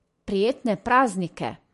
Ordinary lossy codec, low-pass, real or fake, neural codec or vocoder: MP3, 48 kbps; 14.4 kHz; real; none